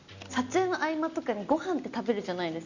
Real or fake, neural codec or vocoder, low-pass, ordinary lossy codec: real; none; 7.2 kHz; none